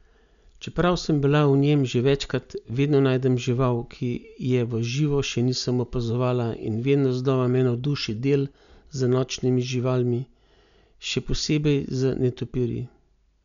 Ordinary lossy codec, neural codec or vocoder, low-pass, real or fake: none; none; 7.2 kHz; real